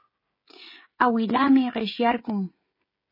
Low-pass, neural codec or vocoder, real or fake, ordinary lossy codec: 5.4 kHz; codec, 16 kHz, 16 kbps, FreqCodec, smaller model; fake; MP3, 24 kbps